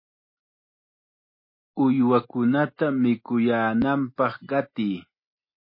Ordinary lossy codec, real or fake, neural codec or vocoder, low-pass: MP3, 24 kbps; real; none; 5.4 kHz